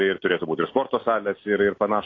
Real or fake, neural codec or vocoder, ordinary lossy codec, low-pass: real; none; AAC, 32 kbps; 7.2 kHz